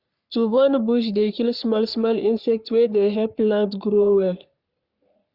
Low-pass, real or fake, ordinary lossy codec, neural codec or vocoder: 5.4 kHz; fake; Opus, 64 kbps; codec, 44.1 kHz, 3.4 kbps, Pupu-Codec